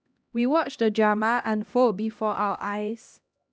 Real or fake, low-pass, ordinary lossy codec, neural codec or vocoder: fake; none; none; codec, 16 kHz, 1 kbps, X-Codec, HuBERT features, trained on LibriSpeech